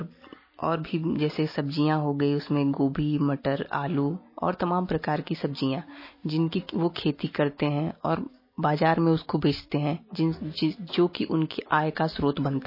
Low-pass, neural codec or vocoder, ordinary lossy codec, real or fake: 5.4 kHz; none; MP3, 24 kbps; real